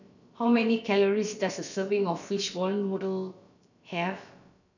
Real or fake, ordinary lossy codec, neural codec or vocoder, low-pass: fake; none; codec, 16 kHz, about 1 kbps, DyCAST, with the encoder's durations; 7.2 kHz